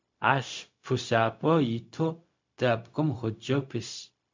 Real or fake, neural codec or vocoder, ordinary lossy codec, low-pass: fake; codec, 16 kHz, 0.4 kbps, LongCat-Audio-Codec; MP3, 64 kbps; 7.2 kHz